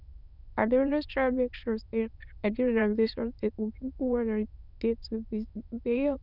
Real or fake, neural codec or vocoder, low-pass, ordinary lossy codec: fake; autoencoder, 22.05 kHz, a latent of 192 numbers a frame, VITS, trained on many speakers; 5.4 kHz; none